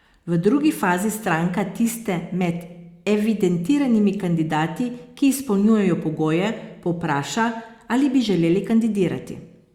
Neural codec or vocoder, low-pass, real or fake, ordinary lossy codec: none; 19.8 kHz; real; Opus, 64 kbps